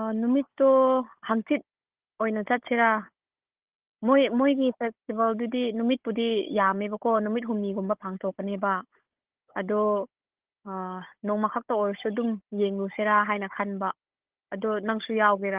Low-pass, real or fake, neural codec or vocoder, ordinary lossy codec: 3.6 kHz; fake; codec, 16 kHz, 16 kbps, FunCodec, trained on Chinese and English, 50 frames a second; Opus, 16 kbps